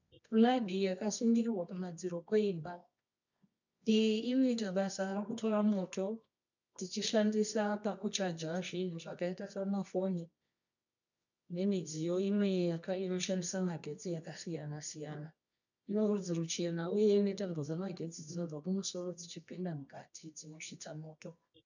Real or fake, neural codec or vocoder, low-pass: fake; codec, 24 kHz, 0.9 kbps, WavTokenizer, medium music audio release; 7.2 kHz